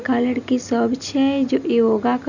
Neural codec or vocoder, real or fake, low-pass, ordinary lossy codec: none; real; 7.2 kHz; none